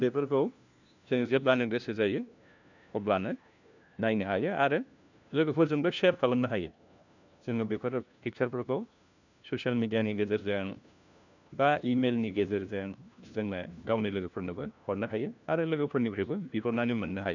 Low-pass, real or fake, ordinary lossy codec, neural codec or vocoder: 7.2 kHz; fake; none; codec, 16 kHz, 1 kbps, FunCodec, trained on LibriTTS, 50 frames a second